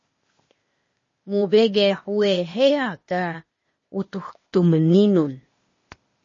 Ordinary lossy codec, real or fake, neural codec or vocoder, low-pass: MP3, 32 kbps; fake; codec, 16 kHz, 0.8 kbps, ZipCodec; 7.2 kHz